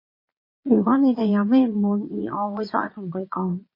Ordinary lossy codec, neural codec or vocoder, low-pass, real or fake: MP3, 24 kbps; vocoder, 22.05 kHz, 80 mel bands, Vocos; 5.4 kHz; fake